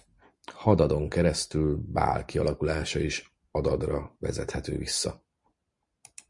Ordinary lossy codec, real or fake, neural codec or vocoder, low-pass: MP3, 64 kbps; real; none; 10.8 kHz